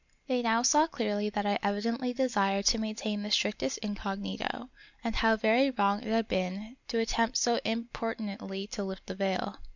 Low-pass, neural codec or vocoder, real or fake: 7.2 kHz; none; real